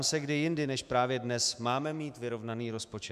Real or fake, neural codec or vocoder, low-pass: fake; autoencoder, 48 kHz, 128 numbers a frame, DAC-VAE, trained on Japanese speech; 14.4 kHz